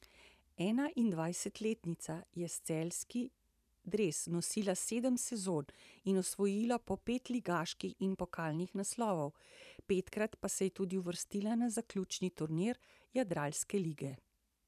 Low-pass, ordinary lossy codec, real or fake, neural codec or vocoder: 14.4 kHz; none; fake; vocoder, 44.1 kHz, 128 mel bands every 512 samples, BigVGAN v2